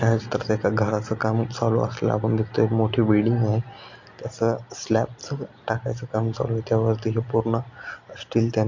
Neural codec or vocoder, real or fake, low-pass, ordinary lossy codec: none; real; 7.2 kHz; MP3, 48 kbps